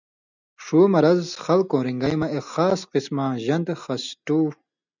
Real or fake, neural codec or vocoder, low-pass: real; none; 7.2 kHz